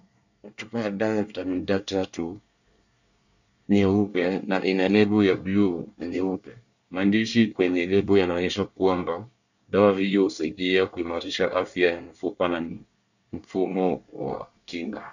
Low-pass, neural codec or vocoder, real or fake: 7.2 kHz; codec, 24 kHz, 1 kbps, SNAC; fake